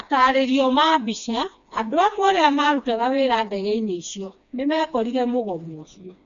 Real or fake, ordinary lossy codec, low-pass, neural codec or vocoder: fake; none; 7.2 kHz; codec, 16 kHz, 2 kbps, FreqCodec, smaller model